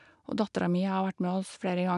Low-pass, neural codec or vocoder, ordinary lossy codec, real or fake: 10.8 kHz; none; none; real